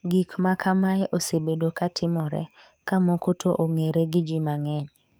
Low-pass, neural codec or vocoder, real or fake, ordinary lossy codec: none; codec, 44.1 kHz, 7.8 kbps, DAC; fake; none